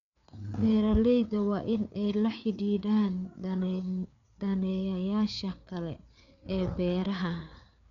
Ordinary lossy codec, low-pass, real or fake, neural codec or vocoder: none; 7.2 kHz; fake; codec, 16 kHz, 4 kbps, FreqCodec, larger model